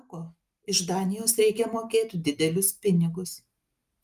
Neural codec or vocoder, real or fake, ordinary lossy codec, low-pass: none; real; Opus, 32 kbps; 14.4 kHz